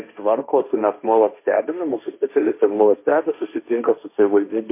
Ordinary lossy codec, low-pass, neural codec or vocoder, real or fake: MP3, 24 kbps; 3.6 kHz; codec, 16 kHz, 1.1 kbps, Voila-Tokenizer; fake